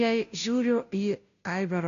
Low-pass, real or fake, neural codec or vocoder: 7.2 kHz; fake; codec, 16 kHz, 0.5 kbps, FunCodec, trained on Chinese and English, 25 frames a second